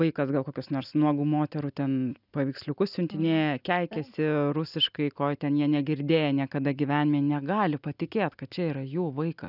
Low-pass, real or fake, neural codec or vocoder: 5.4 kHz; real; none